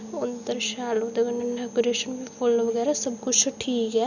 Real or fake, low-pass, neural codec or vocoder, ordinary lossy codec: real; 7.2 kHz; none; none